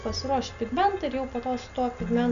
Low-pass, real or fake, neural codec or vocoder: 7.2 kHz; real; none